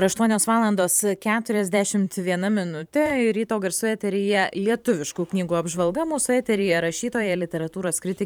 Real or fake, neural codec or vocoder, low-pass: fake; vocoder, 44.1 kHz, 128 mel bands every 512 samples, BigVGAN v2; 19.8 kHz